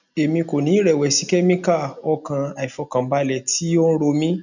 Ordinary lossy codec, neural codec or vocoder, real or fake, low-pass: none; none; real; 7.2 kHz